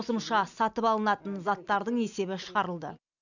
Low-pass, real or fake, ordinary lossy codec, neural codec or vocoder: 7.2 kHz; real; none; none